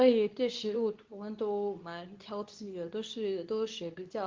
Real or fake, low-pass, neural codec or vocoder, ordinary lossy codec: fake; 7.2 kHz; codec, 24 kHz, 0.9 kbps, WavTokenizer, medium speech release version 2; Opus, 32 kbps